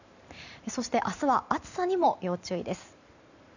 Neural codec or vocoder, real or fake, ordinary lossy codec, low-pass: vocoder, 44.1 kHz, 128 mel bands every 512 samples, BigVGAN v2; fake; none; 7.2 kHz